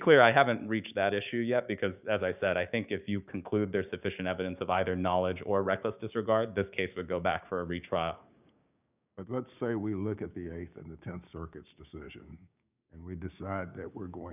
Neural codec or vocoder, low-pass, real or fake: codec, 16 kHz, 6 kbps, DAC; 3.6 kHz; fake